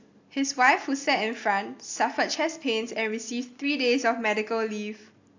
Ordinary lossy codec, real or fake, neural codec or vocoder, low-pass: AAC, 48 kbps; real; none; 7.2 kHz